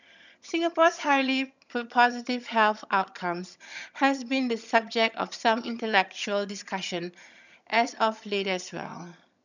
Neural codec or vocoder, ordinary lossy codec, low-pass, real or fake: vocoder, 22.05 kHz, 80 mel bands, HiFi-GAN; none; 7.2 kHz; fake